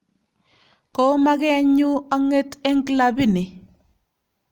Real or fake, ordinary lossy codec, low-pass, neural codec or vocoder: real; Opus, 32 kbps; 19.8 kHz; none